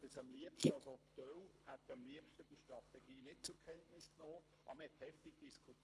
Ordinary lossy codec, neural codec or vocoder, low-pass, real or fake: none; codec, 24 kHz, 3 kbps, HILCodec; none; fake